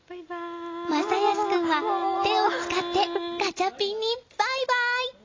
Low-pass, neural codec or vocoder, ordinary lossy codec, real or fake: 7.2 kHz; none; AAC, 32 kbps; real